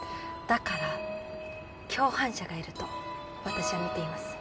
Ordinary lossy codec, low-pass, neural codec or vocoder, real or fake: none; none; none; real